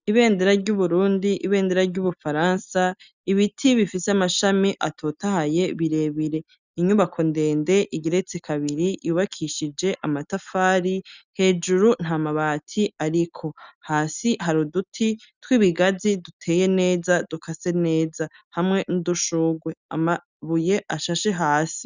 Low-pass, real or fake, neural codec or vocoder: 7.2 kHz; real; none